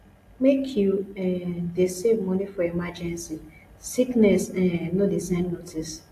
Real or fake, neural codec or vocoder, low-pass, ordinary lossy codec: real; none; 14.4 kHz; AAC, 64 kbps